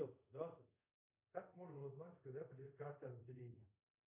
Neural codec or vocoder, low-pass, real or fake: codec, 24 kHz, 0.5 kbps, DualCodec; 3.6 kHz; fake